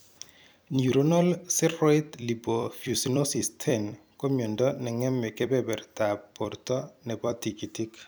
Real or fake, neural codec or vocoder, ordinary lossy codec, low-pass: fake; vocoder, 44.1 kHz, 128 mel bands every 256 samples, BigVGAN v2; none; none